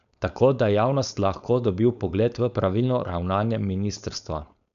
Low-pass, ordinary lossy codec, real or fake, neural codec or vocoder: 7.2 kHz; none; fake; codec, 16 kHz, 4.8 kbps, FACodec